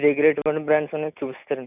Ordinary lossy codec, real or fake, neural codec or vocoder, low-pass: none; real; none; 3.6 kHz